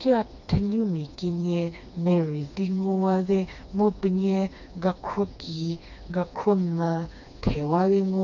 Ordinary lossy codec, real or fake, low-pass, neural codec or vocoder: none; fake; 7.2 kHz; codec, 16 kHz, 2 kbps, FreqCodec, smaller model